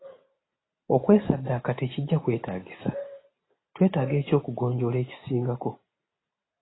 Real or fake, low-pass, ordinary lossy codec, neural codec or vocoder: real; 7.2 kHz; AAC, 16 kbps; none